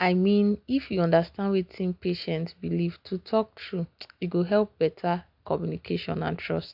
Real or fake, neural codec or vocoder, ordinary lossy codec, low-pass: real; none; AAC, 48 kbps; 5.4 kHz